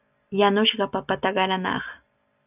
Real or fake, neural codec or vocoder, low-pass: real; none; 3.6 kHz